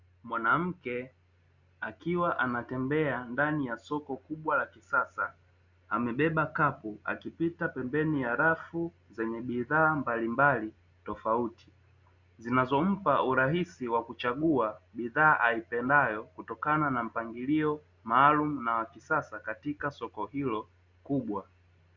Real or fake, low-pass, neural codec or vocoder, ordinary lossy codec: real; 7.2 kHz; none; Opus, 64 kbps